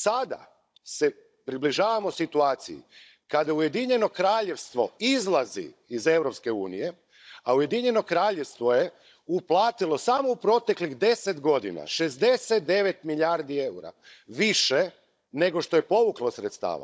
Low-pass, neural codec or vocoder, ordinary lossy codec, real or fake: none; codec, 16 kHz, 16 kbps, FunCodec, trained on Chinese and English, 50 frames a second; none; fake